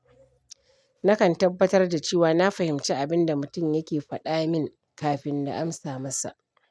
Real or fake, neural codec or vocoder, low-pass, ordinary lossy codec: real; none; none; none